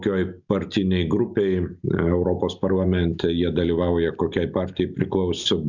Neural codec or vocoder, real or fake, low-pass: none; real; 7.2 kHz